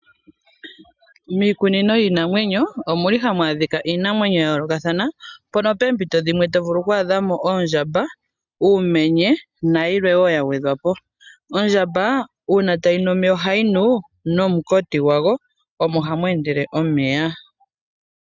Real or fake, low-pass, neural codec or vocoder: real; 7.2 kHz; none